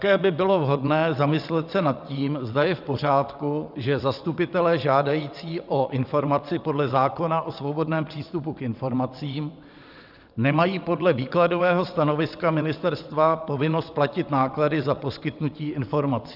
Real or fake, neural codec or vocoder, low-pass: fake; vocoder, 22.05 kHz, 80 mel bands, WaveNeXt; 5.4 kHz